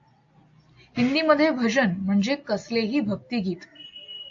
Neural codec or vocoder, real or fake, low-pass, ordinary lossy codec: none; real; 7.2 kHz; AAC, 48 kbps